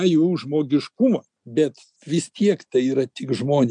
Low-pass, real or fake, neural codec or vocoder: 9.9 kHz; real; none